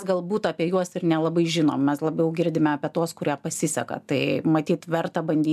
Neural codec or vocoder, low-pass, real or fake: none; 14.4 kHz; real